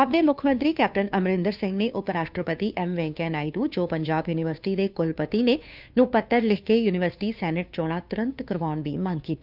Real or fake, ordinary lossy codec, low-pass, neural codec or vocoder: fake; none; 5.4 kHz; codec, 16 kHz, 2 kbps, FunCodec, trained on Chinese and English, 25 frames a second